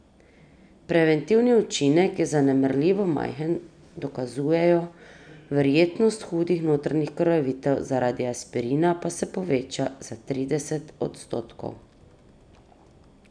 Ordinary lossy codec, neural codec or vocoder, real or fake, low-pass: none; none; real; 9.9 kHz